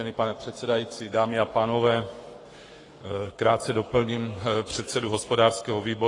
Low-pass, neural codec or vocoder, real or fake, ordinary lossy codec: 10.8 kHz; codec, 44.1 kHz, 7.8 kbps, Pupu-Codec; fake; AAC, 32 kbps